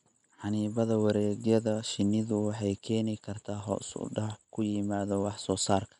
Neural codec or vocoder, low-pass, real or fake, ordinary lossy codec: none; 10.8 kHz; real; none